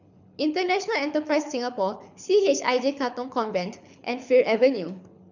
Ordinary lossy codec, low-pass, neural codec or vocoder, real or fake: none; 7.2 kHz; codec, 24 kHz, 6 kbps, HILCodec; fake